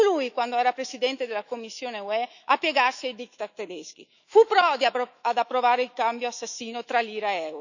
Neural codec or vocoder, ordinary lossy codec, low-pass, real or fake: autoencoder, 48 kHz, 128 numbers a frame, DAC-VAE, trained on Japanese speech; none; 7.2 kHz; fake